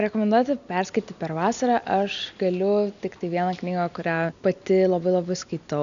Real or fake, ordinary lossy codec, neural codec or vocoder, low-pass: real; MP3, 96 kbps; none; 7.2 kHz